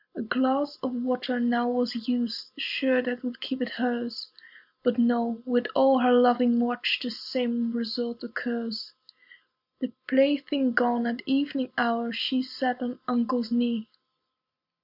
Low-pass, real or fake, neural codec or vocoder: 5.4 kHz; real; none